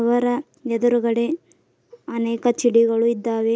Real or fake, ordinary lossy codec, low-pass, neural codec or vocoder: real; none; none; none